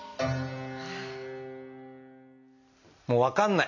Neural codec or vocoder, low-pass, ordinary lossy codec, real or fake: none; 7.2 kHz; none; real